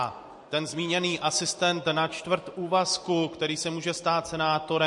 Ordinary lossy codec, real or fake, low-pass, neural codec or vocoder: MP3, 48 kbps; real; 10.8 kHz; none